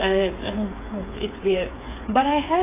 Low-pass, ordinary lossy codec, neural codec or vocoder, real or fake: 3.6 kHz; AAC, 16 kbps; codec, 16 kHz, 8 kbps, FreqCodec, smaller model; fake